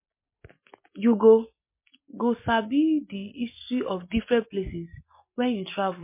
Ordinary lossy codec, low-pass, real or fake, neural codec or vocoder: MP3, 24 kbps; 3.6 kHz; real; none